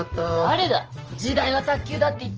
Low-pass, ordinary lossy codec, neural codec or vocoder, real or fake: 7.2 kHz; Opus, 24 kbps; none; real